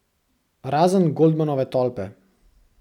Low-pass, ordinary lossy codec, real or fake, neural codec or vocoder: 19.8 kHz; none; real; none